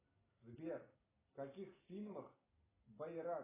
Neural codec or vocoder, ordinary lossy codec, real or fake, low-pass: none; AAC, 16 kbps; real; 3.6 kHz